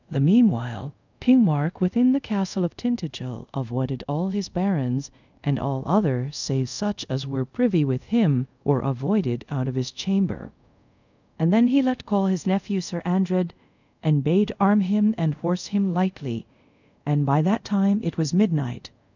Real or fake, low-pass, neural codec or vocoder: fake; 7.2 kHz; codec, 24 kHz, 0.5 kbps, DualCodec